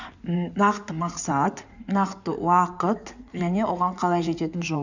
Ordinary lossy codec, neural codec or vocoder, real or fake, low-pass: none; codec, 16 kHz in and 24 kHz out, 2.2 kbps, FireRedTTS-2 codec; fake; 7.2 kHz